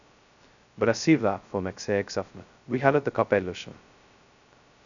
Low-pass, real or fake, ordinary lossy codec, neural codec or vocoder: 7.2 kHz; fake; MP3, 96 kbps; codec, 16 kHz, 0.2 kbps, FocalCodec